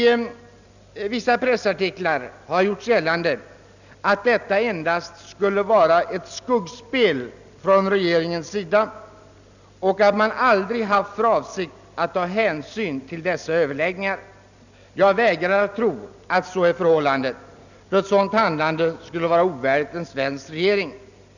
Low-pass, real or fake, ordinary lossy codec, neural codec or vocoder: 7.2 kHz; real; none; none